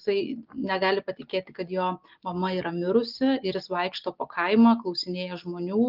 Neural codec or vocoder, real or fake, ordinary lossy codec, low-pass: none; real; Opus, 24 kbps; 5.4 kHz